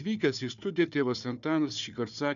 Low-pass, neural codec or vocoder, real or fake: 7.2 kHz; codec, 16 kHz, 4 kbps, FunCodec, trained on Chinese and English, 50 frames a second; fake